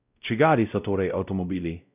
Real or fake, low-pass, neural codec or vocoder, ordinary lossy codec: fake; 3.6 kHz; codec, 16 kHz, 0.5 kbps, X-Codec, WavLM features, trained on Multilingual LibriSpeech; none